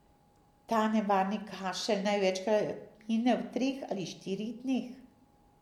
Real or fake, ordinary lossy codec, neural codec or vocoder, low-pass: real; MP3, 96 kbps; none; 19.8 kHz